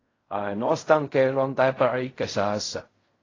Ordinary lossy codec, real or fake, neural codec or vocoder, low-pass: AAC, 32 kbps; fake; codec, 16 kHz in and 24 kHz out, 0.4 kbps, LongCat-Audio-Codec, fine tuned four codebook decoder; 7.2 kHz